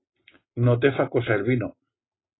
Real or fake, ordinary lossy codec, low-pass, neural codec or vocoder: real; AAC, 16 kbps; 7.2 kHz; none